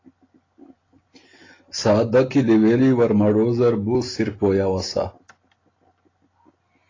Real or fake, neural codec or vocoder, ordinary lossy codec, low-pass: real; none; AAC, 32 kbps; 7.2 kHz